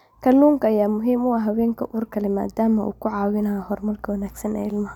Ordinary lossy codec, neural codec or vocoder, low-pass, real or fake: none; none; 19.8 kHz; real